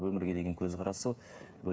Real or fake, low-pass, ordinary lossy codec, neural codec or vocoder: fake; none; none; codec, 16 kHz, 8 kbps, FreqCodec, smaller model